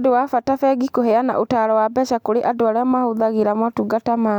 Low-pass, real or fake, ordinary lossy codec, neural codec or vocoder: 19.8 kHz; real; none; none